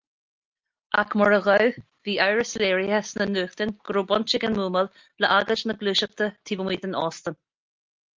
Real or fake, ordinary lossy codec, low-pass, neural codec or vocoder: real; Opus, 24 kbps; 7.2 kHz; none